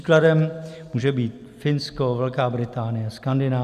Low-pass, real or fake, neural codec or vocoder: 14.4 kHz; real; none